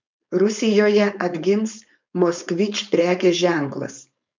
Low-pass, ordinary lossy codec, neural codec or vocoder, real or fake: 7.2 kHz; MP3, 64 kbps; codec, 16 kHz, 4.8 kbps, FACodec; fake